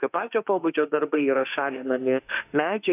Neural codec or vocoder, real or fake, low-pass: autoencoder, 48 kHz, 32 numbers a frame, DAC-VAE, trained on Japanese speech; fake; 3.6 kHz